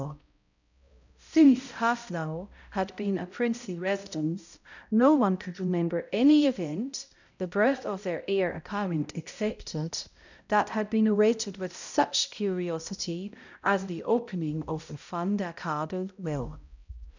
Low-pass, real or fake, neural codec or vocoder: 7.2 kHz; fake; codec, 16 kHz, 0.5 kbps, X-Codec, HuBERT features, trained on balanced general audio